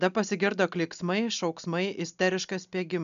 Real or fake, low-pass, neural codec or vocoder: real; 7.2 kHz; none